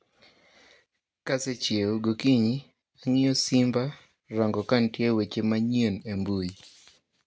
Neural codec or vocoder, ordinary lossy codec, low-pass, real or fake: none; none; none; real